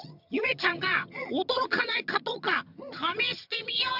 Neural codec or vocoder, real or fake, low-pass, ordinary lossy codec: vocoder, 22.05 kHz, 80 mel bands, HiFi-GAN; fake; 5.4 kHz; none